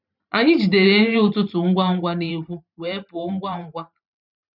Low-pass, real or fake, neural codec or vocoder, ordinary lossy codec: 5.4 kHz; fake; vocoder, 44.1 kHz, 128 mel bands every 512 samples, BigVGAN v2; none